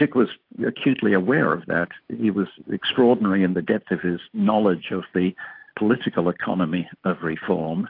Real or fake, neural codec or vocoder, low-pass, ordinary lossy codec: real; none; 5.4 kHz; AAC, 32 kbps